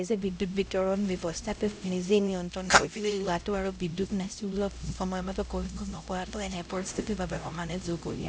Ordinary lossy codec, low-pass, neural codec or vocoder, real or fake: none; none; codec, 16 kHz, 0.5 kbps, X-Codec, HuBERT features, trained on LibriSpeech; fake